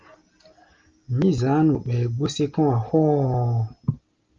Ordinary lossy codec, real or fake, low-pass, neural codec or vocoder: Opus, 24 kbps; real; 7.2 kHz; none